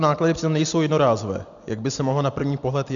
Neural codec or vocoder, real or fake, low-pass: none; real; 7.2 kHz